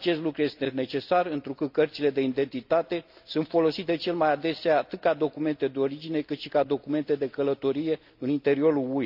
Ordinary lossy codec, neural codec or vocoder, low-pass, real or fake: none; none; 5.4 kHz; real